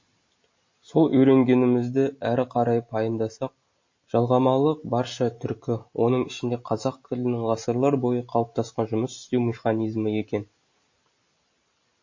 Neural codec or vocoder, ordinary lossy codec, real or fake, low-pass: none; MP3, 32 kbps; real; 7.2 kHz